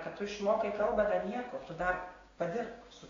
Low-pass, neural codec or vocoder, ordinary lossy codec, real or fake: 7.2 kHz; codec, 16 kHz, 6 kbps, DAC; AAC, 32 kbps; fake